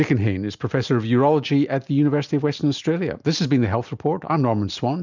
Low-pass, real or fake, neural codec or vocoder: 7.2 kHz; real; none